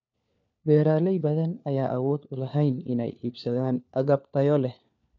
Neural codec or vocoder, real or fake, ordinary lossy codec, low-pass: codec, 16 kHz, 4 kbps, FunCodec, trained on LibriTTS, 50 frames a second; fake; none; 7.2 kHz